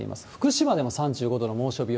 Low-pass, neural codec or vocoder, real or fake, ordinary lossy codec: none; none; real; none